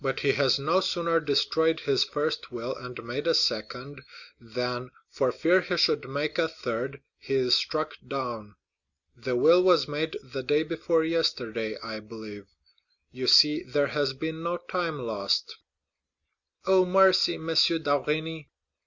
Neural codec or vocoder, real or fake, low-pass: none; real; 7.2 kHz